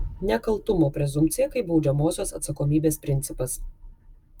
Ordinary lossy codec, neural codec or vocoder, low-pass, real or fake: Opus, 32 kbps; none; 19.8 kHz; real